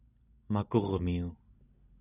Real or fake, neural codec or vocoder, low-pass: real; none; 3.6 kHz